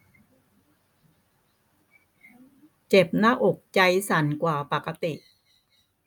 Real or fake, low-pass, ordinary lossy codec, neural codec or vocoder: real; none; none; none